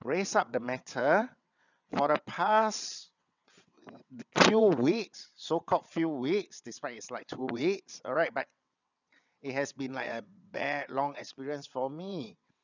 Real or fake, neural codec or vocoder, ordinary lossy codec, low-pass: fake; vocoder, 22.05 kHz, 80 mel bands, WaveNeXt; none; 7.2 kHz